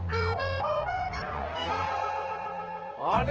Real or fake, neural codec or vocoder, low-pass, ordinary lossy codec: fake; vocoder, 44.1 kHz, 80 mel bands, Vocos; 7.2 kHz; Opus, 16 kbps